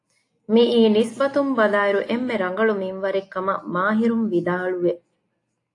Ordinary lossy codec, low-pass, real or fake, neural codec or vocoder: AAC, 48 kbps; 10.8 kHz; real; none